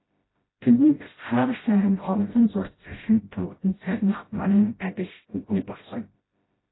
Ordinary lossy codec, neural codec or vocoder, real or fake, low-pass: AAC, 16 kbps; codec, 16 kHz, 0.5 kbps, FreqCodec, smaller model; fake; 7.2 kHz